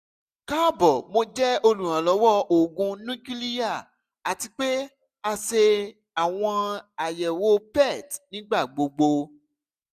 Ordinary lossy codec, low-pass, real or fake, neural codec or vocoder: none; 14.4 kHz; real; none